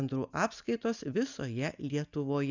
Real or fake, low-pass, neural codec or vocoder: real; 7.2 kHz; none